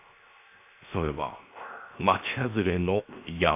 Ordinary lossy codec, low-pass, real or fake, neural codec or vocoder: none; 3.6 kHz; fake; codec, 16 kHz, 0.7 kbps, FocalCodec